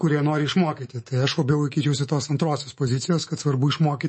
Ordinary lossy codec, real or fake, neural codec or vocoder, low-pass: MP3, 32 kbps; fake; codec, 24 kHz, 3.1 kbps, DualCodec; 10.8 kHz